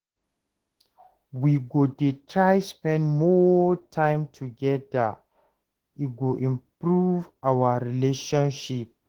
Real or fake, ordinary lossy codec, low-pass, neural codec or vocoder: fake; Opus, 16 kbps; 19.8 kHz; autoencoder, 48 kHz, 32 numbers a frame, DAC-VAE, trained on Japanese speech